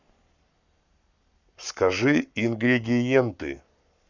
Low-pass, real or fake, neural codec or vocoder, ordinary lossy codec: 7.2 kHz; fake; codec, 44.1 kHz, 7.8 kbps, Pupu-Codec; none